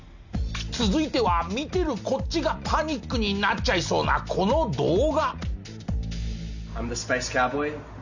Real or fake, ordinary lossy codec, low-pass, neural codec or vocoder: real; none; 7.2 kHz; none